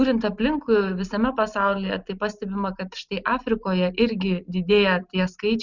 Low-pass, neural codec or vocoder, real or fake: 7.2 kHz; none; real